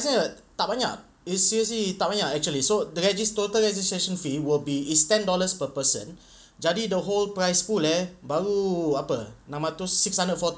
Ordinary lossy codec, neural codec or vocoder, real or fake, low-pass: none; none; real; none